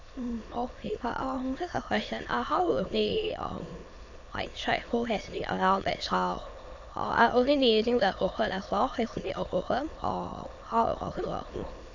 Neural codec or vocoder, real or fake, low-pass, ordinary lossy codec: autoencoder, 22.05 kHz, a latent of 192 numbers a frame, VITS, trained on many speakers; fake; 7.2 kHz; AAC, 48 kbps